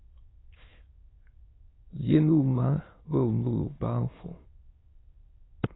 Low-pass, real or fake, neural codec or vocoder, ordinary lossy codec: 7.2 kHz; fake; autoencoder, 22.05 kHz, a latent of 192 numbers a frame, VITS, trained on many speakers; AAC, 16 kbps